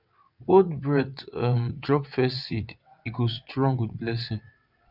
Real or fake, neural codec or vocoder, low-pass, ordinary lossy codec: fake; vocoder, 22.05 kHz, 80 mel bands, WaveNeXt; 5.4 kHz; none